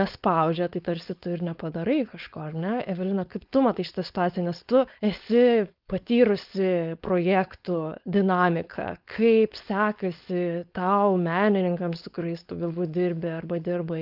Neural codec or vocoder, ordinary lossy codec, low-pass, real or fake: codec, 16 kHz, 4.8 kbps, FACodec; Opus, 24 kbps; 5.4 kHz; fake